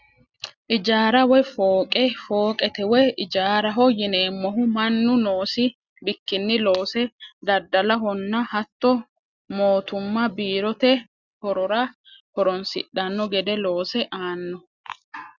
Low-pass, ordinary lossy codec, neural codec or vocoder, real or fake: 7.2 kHz; Opus, 64 kbps; none; real